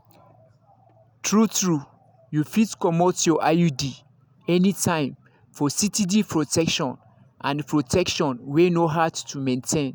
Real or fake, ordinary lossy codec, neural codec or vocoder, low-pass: real; none; none; none